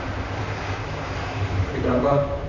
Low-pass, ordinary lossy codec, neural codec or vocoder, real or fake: 7.2 kHz; none; vocoder, 44.1 kHz, 128 mel bands, Pupu-Vocoder; fake